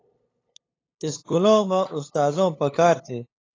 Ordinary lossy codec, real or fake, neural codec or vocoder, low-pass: AAC, 32 kbps; fake; codec, 16 kHz, 8 kbps, FunCodec, trained on LibriTTS, 25 frames a second; 7.2 kHz